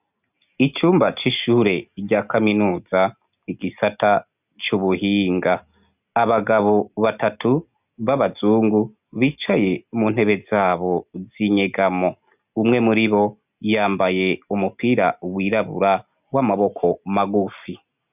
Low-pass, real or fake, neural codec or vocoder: 3.6 kHz; real; none